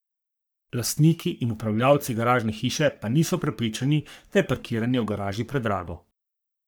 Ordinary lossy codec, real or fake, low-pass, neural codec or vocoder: none; fake; none; codec, 44.1 kHz, 3.4 kbps, Pupu-Codec